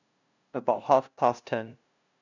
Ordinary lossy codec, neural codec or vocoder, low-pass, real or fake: none; codec, 16 kHz, 0.5 kbps, FunCodec, trained on LibriTTS, 25 frames a second; 7.2 kHz; fake